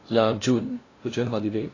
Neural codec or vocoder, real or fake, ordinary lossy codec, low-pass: codec, 16 kHz, 0.5 kbps, FunCodec, trained on LibriTTS, 25 frames a second; fake; AAC, 32 kbps; 7.2 kHz